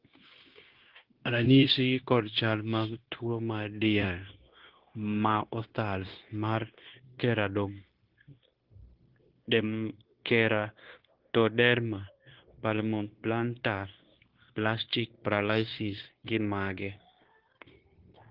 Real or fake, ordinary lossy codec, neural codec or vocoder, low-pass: fake; Opus, 16 kbps; codec, 16 kHz, 0.9 kbps, LongCat-Audio-Codec; 5.4 kHz